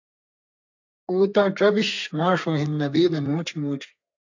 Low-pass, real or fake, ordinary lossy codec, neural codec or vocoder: 7.2 kHz; fake; AAC, 48 kbps; codec, 32 kHz, 1.9 kbps, SNAC